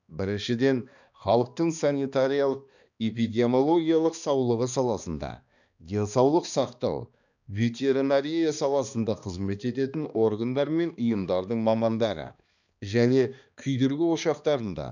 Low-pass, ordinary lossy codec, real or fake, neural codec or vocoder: 7.2 kHz; none; fake; codec, 16 kHz, 2 kbps, X-Codec, HuBERT features, trained on balanced general audio